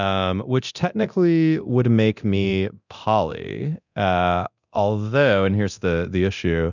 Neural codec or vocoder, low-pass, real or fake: codec, 24 kHz, 0.9 kbps, DualCodec; 7.2 kHz; fake